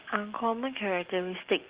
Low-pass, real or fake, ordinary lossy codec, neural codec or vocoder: 3.6 kHz; real; Opus, 16 kbps; none